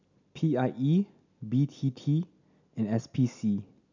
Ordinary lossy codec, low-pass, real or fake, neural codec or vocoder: none; 7.2 kHz; real; none